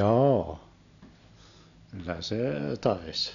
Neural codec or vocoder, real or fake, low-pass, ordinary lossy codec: none; real; 7.2 kHz; none